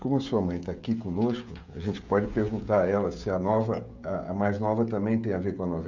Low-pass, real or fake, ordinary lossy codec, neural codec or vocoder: 7.2 kHz; fake; MP3, 64 kbps; codec, 16 kHz, 16 kbps, FreqCodec, smaller model